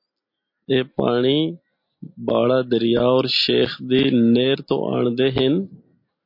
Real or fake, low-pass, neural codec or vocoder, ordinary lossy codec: real; 5.4 kHz; none; MP3, 32 kbps